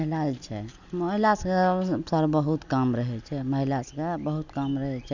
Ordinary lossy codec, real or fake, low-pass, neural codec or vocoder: none; real; 7.2 kHz; none